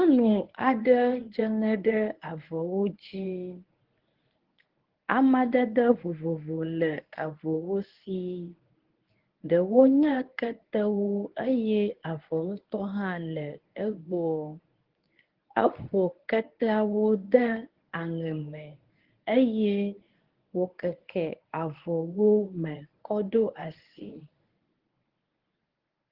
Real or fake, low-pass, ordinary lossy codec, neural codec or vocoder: fake; 5.4 kHz; Opus, 16 kbps; codec, 24 kHz, 0.9 kbps, WavTokenizer, medium speech release version 1